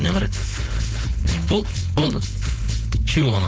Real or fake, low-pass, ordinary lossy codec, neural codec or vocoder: fake; none; none; codec, 16 kHz, 4.8 kbps, FACodec